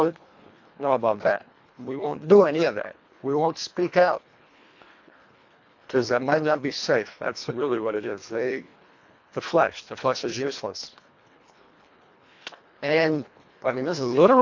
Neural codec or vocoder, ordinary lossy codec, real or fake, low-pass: codec, 24 kHz, 1.5 kbps, HILCodec; AAC, 48 kbps; fake; 7.2 kHz